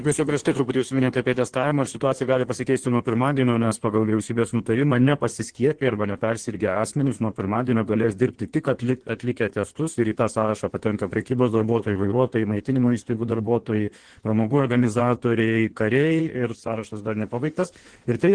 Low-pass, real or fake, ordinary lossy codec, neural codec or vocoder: 9.9 kHz; fake; Opus, 16 kbps; codec, 16 kHz in and 24 kHz out, 1.1 kbps, FireRedTTS-2 codec